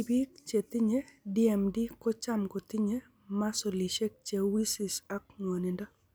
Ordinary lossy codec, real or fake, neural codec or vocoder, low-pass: none; real; none; none